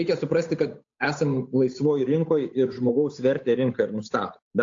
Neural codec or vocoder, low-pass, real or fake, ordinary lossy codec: codec, 16 kHz, 8 kbps, FunCodec, trained on Chinese and English, 25 frames a second; 7.2 kHz; fake; AAC, 48 kbps